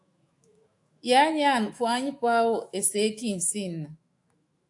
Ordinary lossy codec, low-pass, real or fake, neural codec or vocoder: AAC, 64 kbps; 10.8 kHz; fake; autoencoder, 48 kHz, 128 numbers a frame, DAC-VAE, trained on Japanese speech